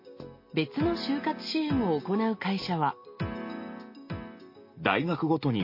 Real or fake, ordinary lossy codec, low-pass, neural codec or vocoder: real; MP3, 24 kbps; 5.4 kHz; none